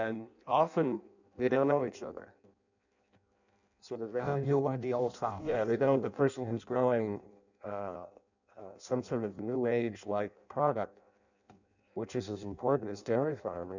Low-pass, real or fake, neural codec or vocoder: 7.2 kHz; fake; codec, 16 kHz in and 24 kHz out, 0.6 kbps, FireRedTTS-2 codec